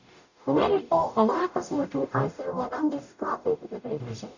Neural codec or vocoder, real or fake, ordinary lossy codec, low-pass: codec, 44.1 kHz, 0.9 kbps, DAC; fake; none; 7.2 kHz